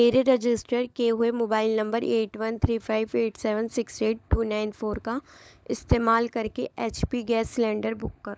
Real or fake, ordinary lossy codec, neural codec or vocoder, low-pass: fake; none; codec, 16 kHz, 16 kbps, FunCodec, trained on LibriTTS, 50 frames a second; none